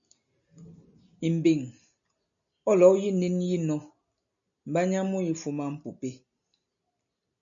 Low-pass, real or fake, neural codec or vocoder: 7.2 kHz; real; none